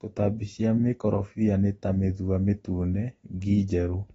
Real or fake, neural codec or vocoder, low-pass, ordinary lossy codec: real; none; 19.8 kHz; AAC, 24 kbps